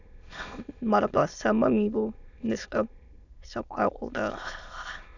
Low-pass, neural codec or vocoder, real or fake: 7.2 kHz; autoencoder, 22.05 kHz, a latent of 192 numbers a frame, VITS, trained on many speakers; fake